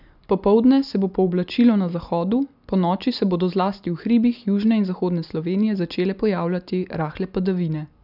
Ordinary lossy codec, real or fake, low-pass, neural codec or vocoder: none; real; 5.4 kHz; none